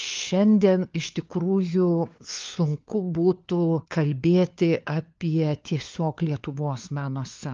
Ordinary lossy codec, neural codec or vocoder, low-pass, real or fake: Opus, 24 kbps; codec, 16 kHz, 4 kbps, FunCodec, trained on LibriTTS, 50 frames a second; 7.2 kHz; fake